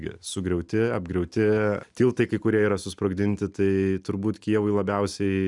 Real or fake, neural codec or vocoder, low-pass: real; none; 10.8 kHz